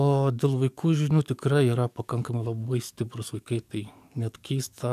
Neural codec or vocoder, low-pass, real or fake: codec, 44.1 kHz, 7.8 kbps, DAC; 14.4 kHz; fake